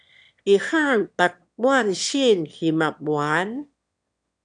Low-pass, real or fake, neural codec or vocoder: 9.9 kHz; fake; autoencoder, 22.05 kHz, a latent of 192 numbers a frame, VITS, trained on one speaker